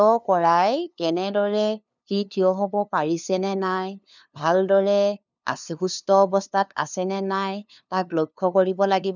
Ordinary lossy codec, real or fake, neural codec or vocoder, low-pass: none; fake; codec, 16 kHz, 2 kbps, FunCodec, trained on LibriTTS, 25 frames a second; 7.2 kHz